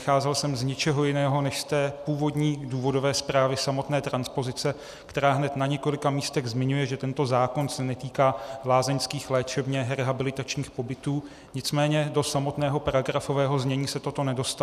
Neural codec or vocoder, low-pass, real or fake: none; 14.4 kHz; real